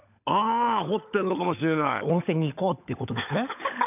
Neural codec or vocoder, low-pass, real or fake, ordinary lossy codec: codec, 16 kHz, 16 kbps, FunCodec, trained on LibriTTS, 50 frames a second; 3.6 kHz; fake; none